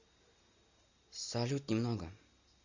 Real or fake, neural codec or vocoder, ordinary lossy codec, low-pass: real; none; Opus, 64 kbps; 7.2 kHz